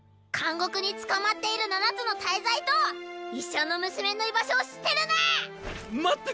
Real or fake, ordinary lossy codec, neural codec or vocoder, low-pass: real; none; none; none